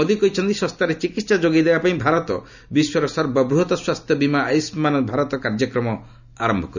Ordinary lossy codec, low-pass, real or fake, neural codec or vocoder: none; 7.2 kHz; real; none